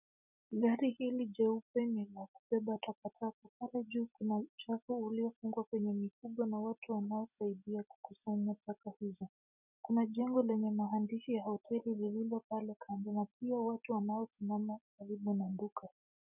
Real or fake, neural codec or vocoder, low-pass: real; none; 3.6 kHz